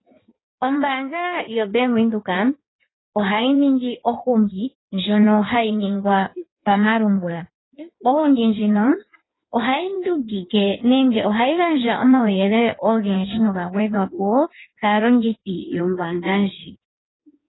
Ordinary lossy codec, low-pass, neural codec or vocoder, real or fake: AAC, 16 kbps; 7.2 kHz; codec, 16 kHz in and 24 kHz out, 1.1 kbps, FireRedTTS-2 codec; fake